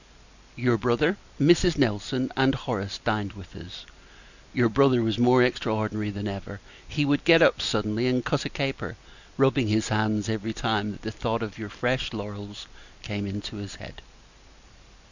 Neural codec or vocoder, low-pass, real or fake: none; 7.2 kHz; real